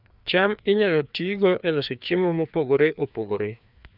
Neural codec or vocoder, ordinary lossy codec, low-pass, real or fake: codec, 16 kHz, 2 kbps, FreqCodec, larger model; none; 5.4 kHz; fake